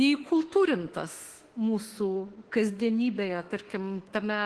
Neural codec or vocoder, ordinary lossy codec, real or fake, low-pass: autoencoder, 48 kHz, 32 numbers a frame, DAC-VAE, trained on Japanese speech; Opus, 16 kbps; fake; 10.8 kHz